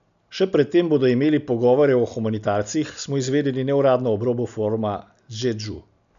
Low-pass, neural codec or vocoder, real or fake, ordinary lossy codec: 7.2 kHz; none; real; none